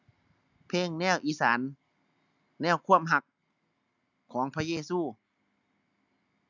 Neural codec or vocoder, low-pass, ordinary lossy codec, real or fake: none; 7.2 kHz; none; real